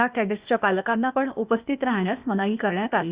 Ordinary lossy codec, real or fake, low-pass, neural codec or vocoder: Opus, 64 kbps; fake; 3.6 kHz; codec, 16 kHz, 0.8 kbps, ZipCodec